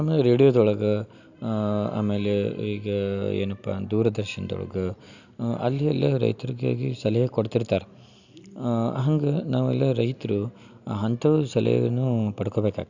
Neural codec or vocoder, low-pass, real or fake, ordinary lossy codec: none; 7.2 kHz; real; none